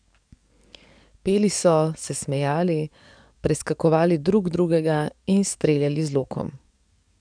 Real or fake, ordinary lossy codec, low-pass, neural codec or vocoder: fake; none; 9.9 kHz; codec, 44.1 kHz, 7.8 kbps, DAC